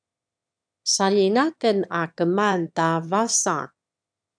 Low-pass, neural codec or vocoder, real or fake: 9.9 kHz; autoencoder, 22.05 kHz, a latent of 192 numbers a frame, VITS, trained on one speaker; fake